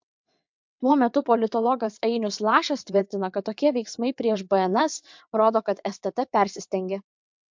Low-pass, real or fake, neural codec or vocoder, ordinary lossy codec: 7.2 kHz; fake; vocoder, 22.05 kHz, 80 mel bands, WaveNeXt; MP3, 64 kbps